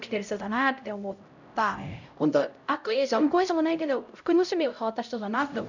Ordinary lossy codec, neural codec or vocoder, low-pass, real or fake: none; codec, 16 kHz, 0.5 kbps, X-Codec, HuBERT features, trained on LibriSpeech; 7.2 kHz; fake